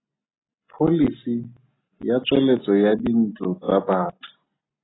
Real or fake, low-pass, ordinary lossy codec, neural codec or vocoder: real; 7.2 kHz; AAC, 16 kbps; none